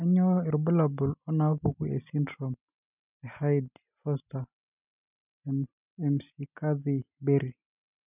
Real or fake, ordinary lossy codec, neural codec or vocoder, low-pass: real; none; none; 3.6 kHz